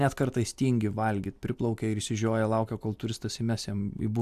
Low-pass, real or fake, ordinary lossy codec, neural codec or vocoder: 14.4 kHz; real; AAC, 96 kbps; none